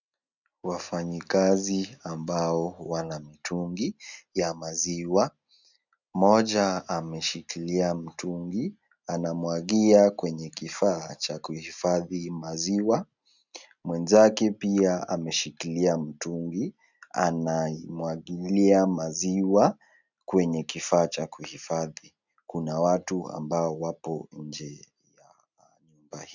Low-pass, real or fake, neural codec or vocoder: 7.2 kHz; real; none